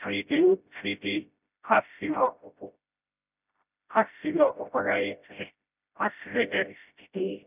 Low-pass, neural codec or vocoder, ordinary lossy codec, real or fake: 3.6 kHz; codec, 16 kHz, 0.5 kbps, FreqCodec, smaller model; none; fake